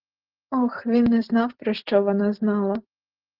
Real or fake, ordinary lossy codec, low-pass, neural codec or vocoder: real; Opus, 16 kbps; 5.4 kHz; none